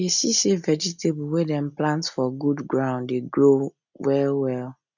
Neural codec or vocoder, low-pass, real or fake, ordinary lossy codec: none; 7.2 kHz; real; none